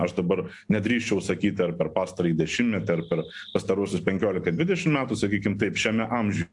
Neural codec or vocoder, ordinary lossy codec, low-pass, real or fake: none; AAC, 64 kbps; 10.8 kHz; real